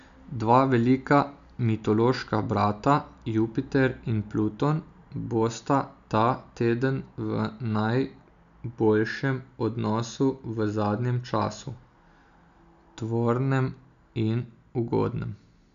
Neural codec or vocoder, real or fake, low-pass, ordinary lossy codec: none; real; 7.2 kHz; AAC, 96 kbps